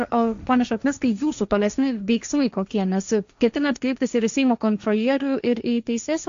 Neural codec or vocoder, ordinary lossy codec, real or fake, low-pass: codec, 16 kHz, 1.1 kbps, Voila-Tokenizer; MP3, 64 kbps; fake; 7.2 kHz